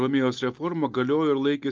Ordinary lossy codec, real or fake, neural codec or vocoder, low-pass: Opus, 32 kbps; real; none; 7.2 kHz